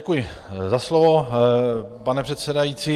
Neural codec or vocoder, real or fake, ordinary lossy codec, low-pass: none; real; Opus, 24 kbps; 14.4 kHz